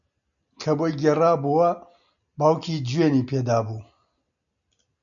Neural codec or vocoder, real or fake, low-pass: none; real; 7.2 kHz